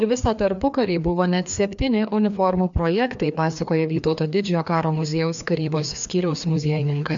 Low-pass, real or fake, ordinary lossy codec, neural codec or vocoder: 7.2 kHz; fake; MP3, 64 kbps; codec, 16 kHz, 2 kbps, FreqCodec, larger model